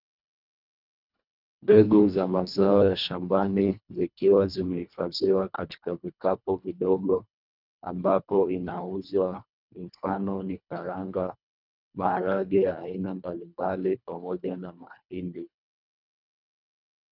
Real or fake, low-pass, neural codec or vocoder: fake; 5.4 kHz; codec, 24 kHz, 1.5 kbps, HILCodec